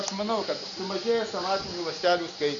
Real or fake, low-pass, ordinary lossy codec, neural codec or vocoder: real; 7.2 kHz; Opus, 64 kbps; none